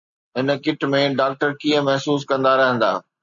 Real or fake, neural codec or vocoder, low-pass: real; none; 7.2 kHz